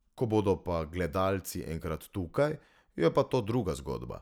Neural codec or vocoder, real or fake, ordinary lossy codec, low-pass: none; real; none; 19.8 kHz